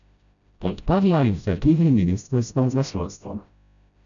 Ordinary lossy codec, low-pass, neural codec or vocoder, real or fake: none; 7.2 kHz; codec, 16 kHz, 0.5 kbps, FreqCodec, smaller model; fake